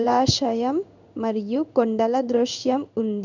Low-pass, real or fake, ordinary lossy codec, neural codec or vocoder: 7.2 kHz; fake; none; codec, 16 kHz in and 24 kHz out, 1 kbps, XY-Tokenizer